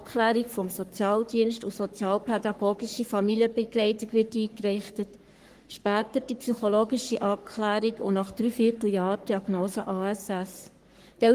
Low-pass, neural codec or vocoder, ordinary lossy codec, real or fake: 14.4 kHz; codec, 44.1 kHz, 3.4 kbps, Pupu-Codec; Opus, 24 kbps; fake